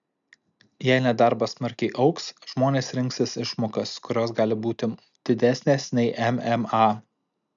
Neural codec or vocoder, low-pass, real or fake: none; 7.2 kHz; real